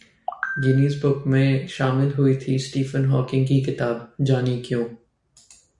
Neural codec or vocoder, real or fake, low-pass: none; real; 10.8 kHz